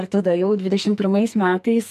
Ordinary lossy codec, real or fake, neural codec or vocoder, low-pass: MP3, 96 kbps; fake; codec, 44.1 kHz, 2.6 kbps, SNAC; 14.4 kHz